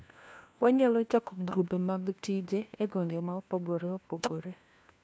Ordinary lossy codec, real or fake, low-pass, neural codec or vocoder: none; fake; none; codec, 16 kHz, 1 kbps, FunCodec, trained on LibriTTS, 50 frames a second